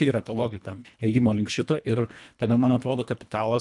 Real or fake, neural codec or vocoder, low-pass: fake; codec, 24 kHz, 1.5 kbps, HILCodec; 10.8 kHz